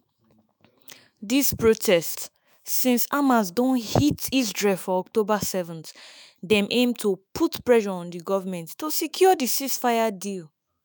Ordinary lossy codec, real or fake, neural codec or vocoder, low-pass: none; fake; autoencoder, 48 kHz, 128 numbers a frame, DAC-VAE, trained on Japanese speech; none